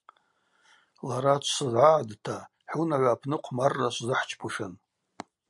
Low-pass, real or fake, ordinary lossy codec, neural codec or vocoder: 10.8 kHz; real; MP3, 96 kbps; none